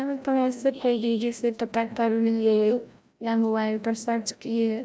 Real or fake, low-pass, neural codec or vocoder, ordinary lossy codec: fake; none; codec, 16 kHz, 0.5 kbps, FreqCodec, larger model; none